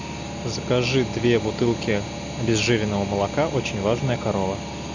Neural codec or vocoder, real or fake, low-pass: none; real; 7.2 kHz